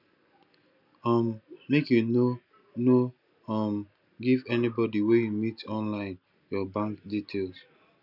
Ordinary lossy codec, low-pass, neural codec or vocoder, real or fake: none; 5.4 kHz; none; real